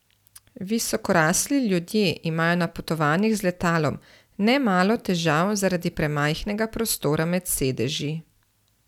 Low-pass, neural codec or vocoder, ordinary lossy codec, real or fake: 19.8 kHz; none; none; real